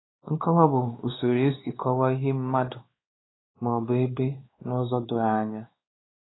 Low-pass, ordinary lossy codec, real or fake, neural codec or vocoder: 7.2 kHz; AAC, 16 kbps; fake; codec, 16 kHz, 2 kbps, X-Codec, WavLM features, trained on Multilingual LibriSpeech